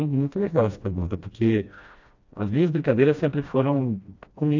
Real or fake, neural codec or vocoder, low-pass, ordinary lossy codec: fake; codec, 16 kHz, 1 kbps, FreqCodec, smaller model; 7.2 kHz; AAC, 48 kbps